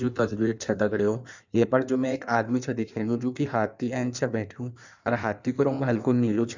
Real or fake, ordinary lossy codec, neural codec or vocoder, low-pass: fake; none; codec, 16 kHz in and 24 kHz out, 1.1 kbps, FireRedTTS-2 codec; 7.2 kHz